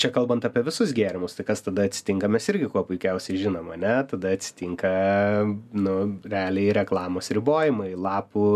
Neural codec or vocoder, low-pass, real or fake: none; 14.4 kHz; real